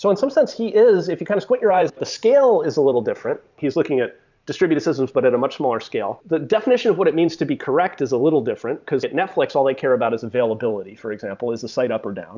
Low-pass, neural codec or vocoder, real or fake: 7.2 kHz; vocoder, 44.1 kHz, 128 mel bands every 512 samples, BigVGAN v2; fake